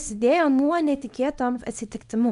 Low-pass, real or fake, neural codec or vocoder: 10.8 kHz; fake; codec, 24 kHz, 0.9 kbps, WavTokenizer, small release